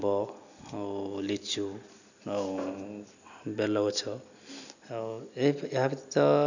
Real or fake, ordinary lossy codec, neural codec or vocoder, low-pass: real; none; none; 7.2 kHz